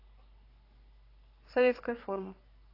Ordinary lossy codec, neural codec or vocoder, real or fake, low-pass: AAC, 24 kbps; codec, 44.1 kHz, 3.4 kbps, Pupu-Codec; fake; 5.4 kHz